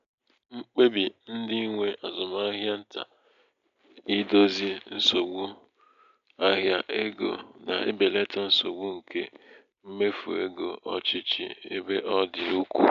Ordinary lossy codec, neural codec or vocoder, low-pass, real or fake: none; none; 7.2 kHz; real